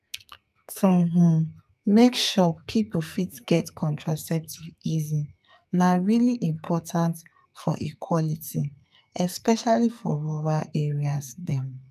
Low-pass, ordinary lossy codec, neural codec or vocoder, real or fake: 14.4 kHz; none; codec, 44.1 kHz, 2.6 kbps, SNAC; fake